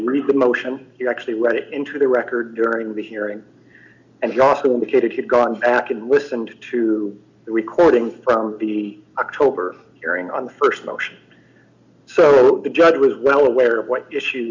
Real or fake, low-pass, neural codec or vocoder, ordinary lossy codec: real; 7.2 kHz; none; MP3, 64 kbps